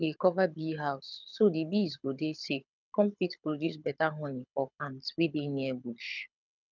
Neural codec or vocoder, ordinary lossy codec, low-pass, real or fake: codec, 24 kHz, 6 kbps, HILCodec; none; 7.2 kHz; fake